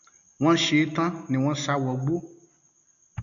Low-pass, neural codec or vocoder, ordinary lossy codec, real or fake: 7.2 kHz; none; none; real